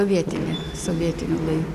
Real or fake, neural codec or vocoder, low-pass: real; none; 14.4 kHz